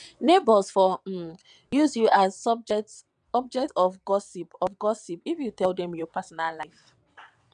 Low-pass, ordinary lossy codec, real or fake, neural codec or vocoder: 9.9 kHz; none; fake; vocoder, 22.05 kHz, 80 mel bands, WaveNeXt